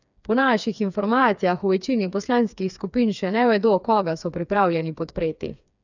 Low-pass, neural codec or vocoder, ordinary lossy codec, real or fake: 7.2 kHz; codec, 16 kHz, 4 kbps, FreqCodec, smaller model; none; fake